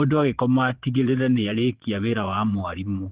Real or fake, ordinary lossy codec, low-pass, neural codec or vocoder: fake; Opus, 16 kbps; 3.6 kHz; vocoder, 22.05 kHz, 80 mel bands, Vocos